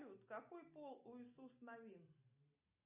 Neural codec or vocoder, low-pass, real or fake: none; 3.6 kHz; real